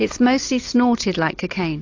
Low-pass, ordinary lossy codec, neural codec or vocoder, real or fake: 7.2 kHz; AAC, 48 kbps; none; real